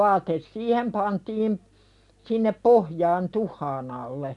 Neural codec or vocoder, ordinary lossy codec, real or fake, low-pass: none; none; real; 10.8 kHz